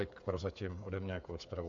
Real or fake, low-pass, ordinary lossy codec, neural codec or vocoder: fake; 7.2 kHz; AAC, 48 kbps; codec, 16 kHz, 8 kbps, FreqCodec, smaller model